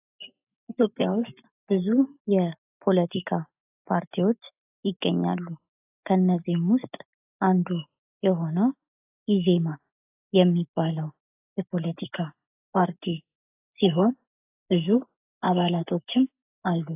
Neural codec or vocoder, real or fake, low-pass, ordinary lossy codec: none; real; 3.6 kHz; AAC, 32 kbps